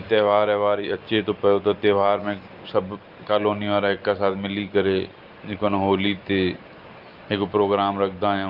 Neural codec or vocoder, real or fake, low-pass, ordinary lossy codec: none; real; 5.4 kHz; Opus, 24 kbps